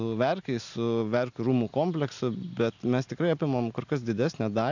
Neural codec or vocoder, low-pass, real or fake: none; 7.2 kHz; real